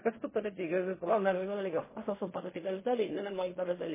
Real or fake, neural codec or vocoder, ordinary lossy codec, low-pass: fake; codec, 16 kHz in and 24 kHz out, 0.4 kbps, LongCat-Audio-Codec, fine tuned four codebook decoder; MP3, 16 kbps; 3.6 kHz